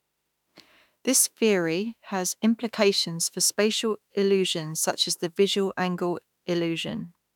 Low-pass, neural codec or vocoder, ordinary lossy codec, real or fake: 19.8 kHz; autoencoder, 48 kHz, 32 numbers a frame, DAC-VAE, trained on Japanese speech; none; fake